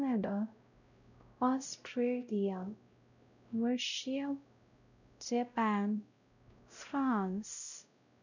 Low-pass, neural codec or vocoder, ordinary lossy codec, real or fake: 7.2 kHz; codec, 16 kHz, 0.5 kbps, X-Codec, WavLM features, trained on Multilingual LibriSpeech; none; fake